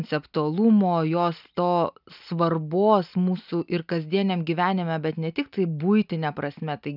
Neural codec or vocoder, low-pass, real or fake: none; 5.4 kHz; real